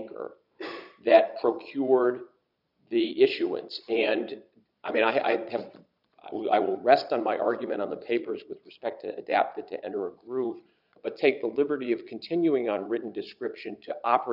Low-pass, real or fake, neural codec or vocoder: 5.4 kHz; fake; vocoder, 22.05 kHz, 80 mel bands, Vocos